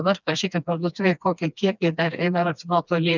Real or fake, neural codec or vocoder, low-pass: fake; codec, 16 kHz, 2 kbps, FreqCodec, smaller model; 7.2 kHz